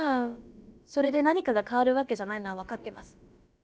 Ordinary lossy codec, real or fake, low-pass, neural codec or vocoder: none; fake; none; codec, 16 kHz, about 1 kbps, DyCAST, with the encoder's durations